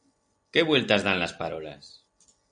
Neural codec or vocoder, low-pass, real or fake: none; 9.9 kHz; real